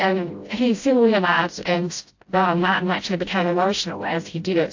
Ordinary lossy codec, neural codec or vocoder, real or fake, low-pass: AAC, 48 kbps; codec, 16 kHz, 0.5 kbps, FreqCodec, smaller model; fake; 7.2 kHz